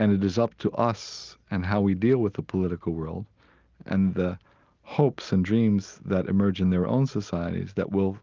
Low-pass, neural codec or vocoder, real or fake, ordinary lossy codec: 7.2 kHz; none; real; Opus, 32 kbps